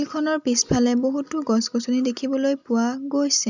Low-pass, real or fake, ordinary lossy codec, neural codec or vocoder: 7.2 kHz; real; none; none